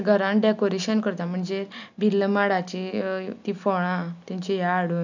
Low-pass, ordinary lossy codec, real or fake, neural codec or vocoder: 7.2 kHz; none; real; none